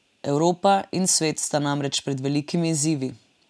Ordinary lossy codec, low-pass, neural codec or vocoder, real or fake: none; none; none; real